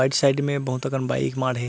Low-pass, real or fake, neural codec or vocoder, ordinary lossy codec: none; real; none; none